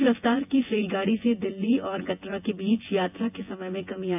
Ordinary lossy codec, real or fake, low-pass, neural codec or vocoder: AAC, 32 kbps; fake; 3.6 kHz; vocoder, 24 kHz, 100 mel bands, Vocos